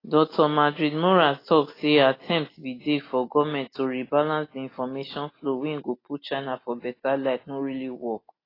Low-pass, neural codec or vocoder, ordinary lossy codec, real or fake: 5.4 kHz; none; AAC, 24 kbps; real